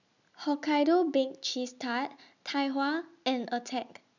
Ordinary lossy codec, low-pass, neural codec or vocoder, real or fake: none; 7.2 kHz; none; real